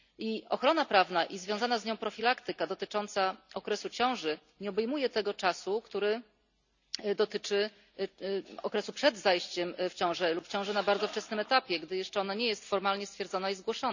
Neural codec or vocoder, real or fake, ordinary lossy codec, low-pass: none; real; none; 7.2 kHz